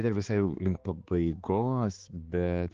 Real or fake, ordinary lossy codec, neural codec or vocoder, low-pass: fake; Opus, 16 kbps; codec, 16 kHz, 4 kbps, X-Codec, HuBERT features, trained on balanced general audio; 7.2 kHz